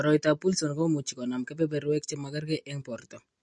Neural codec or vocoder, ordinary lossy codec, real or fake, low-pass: none; MP3, 48 kbps; real; 9.9 kHz